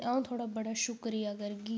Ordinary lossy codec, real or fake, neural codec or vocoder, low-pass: none; real; none; none